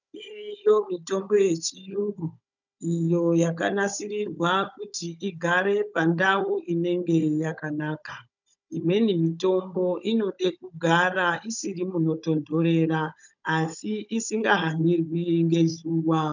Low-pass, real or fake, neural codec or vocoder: 7.2 kHz; fake; codec, 16 kHz, 16 kbps, FunCodec, trained on Chinese and English, 50 frames a second